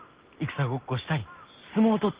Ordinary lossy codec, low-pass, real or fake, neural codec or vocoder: Opus, 16 kbps; 3.6 kHz; real; none